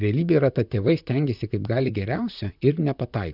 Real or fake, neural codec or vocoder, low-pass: fake; vocoder, 44.1 kHz, 128 mel bands, Pupu-Vocoder; 5.4 kHz